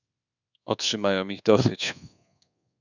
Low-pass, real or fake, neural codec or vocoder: 7.2 kHz; fake; codec, 24 kHz, 1.2 kbps, DualCodec